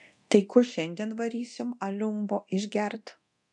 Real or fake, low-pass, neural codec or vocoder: fake; 10.8 kHz; codec, 24 kHz, 0.9 kbps, DualCodec